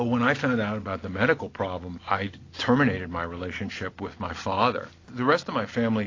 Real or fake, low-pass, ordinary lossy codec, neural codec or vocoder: real; 7.2 kHz; AAC, 32 kbps; none